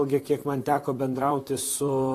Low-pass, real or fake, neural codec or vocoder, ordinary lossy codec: 14.4 kHz; fake; vocoder, 44.1 kHz, 128 mel bands, Pupu-Vocoder; AAC, 48 kbps